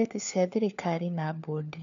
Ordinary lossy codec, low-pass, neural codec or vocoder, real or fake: none; 7.2 kHz; codec, 16 kHz, 4 kbps, FunCodec, trained on LibriTTS, 50 frames a second; fake